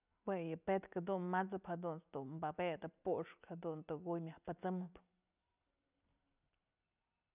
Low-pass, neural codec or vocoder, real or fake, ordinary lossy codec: 3.6 kHz; none; real; none